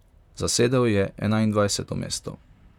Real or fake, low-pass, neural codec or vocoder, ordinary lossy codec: fake; 19.8 kHz; vocoder, 44.1 kHz, 128 mel bands, Pupu-Vocoder; none